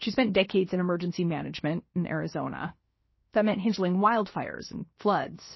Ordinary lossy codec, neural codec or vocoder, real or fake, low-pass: MP3, 24 kbps; codec, 16 kHz, 0.7 kbps, FocalCodec; fake; 7.2 kHz